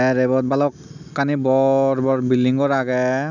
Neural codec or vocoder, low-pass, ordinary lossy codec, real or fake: none; 7.2 kHz; none; real